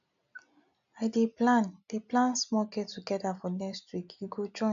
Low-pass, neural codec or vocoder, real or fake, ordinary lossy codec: 7.2 kHz; none; real; none